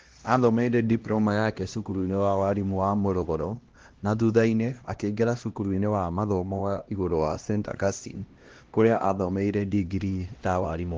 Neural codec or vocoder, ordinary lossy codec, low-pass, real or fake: codec, 16 kHz, 1 kbps, X-Codec, HuBERT features, trained on LibriSpeech; Opus, 16 kbps; 7.2 kHz; fake